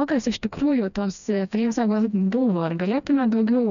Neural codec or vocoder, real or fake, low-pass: codec, 16 kHz, 1 kbps, FreqCodec, smaller model; fake; 7.2 kHz